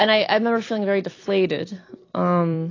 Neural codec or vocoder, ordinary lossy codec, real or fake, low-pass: none; AAC, 32 kbps; real; 7.2 kHz